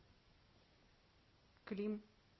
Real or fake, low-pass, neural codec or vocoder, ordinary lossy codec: real; 7.2 kHz; none; MP3, 24 kbps